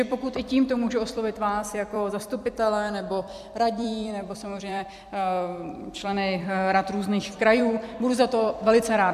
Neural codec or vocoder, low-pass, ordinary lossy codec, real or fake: vocoder, 44.1 kHz, 128 mel bands every 256 samples, BigVGAN v2; 14.4 kHz; AAC, 96 kbps; fake